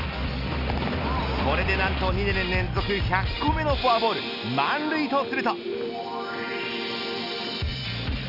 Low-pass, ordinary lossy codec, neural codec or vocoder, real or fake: 5.4 kHz; none; none; real